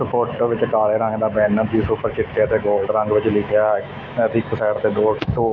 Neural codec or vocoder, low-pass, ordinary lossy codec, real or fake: autoencoder, 48 kHz, 128 numbers a frame, DAC-VAE, trained on Japanese speech; 7.2 kHz; none; fake